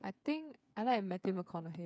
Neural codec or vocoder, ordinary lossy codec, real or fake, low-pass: codec, 16 kHz, 8 kbps, FreqCodec, smaller model; none; fake; none